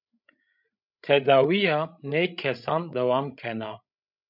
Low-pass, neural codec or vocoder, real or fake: 5.4 kHz; codec, 16 kHz, 16 kbps, FreqCodec, larger model; fake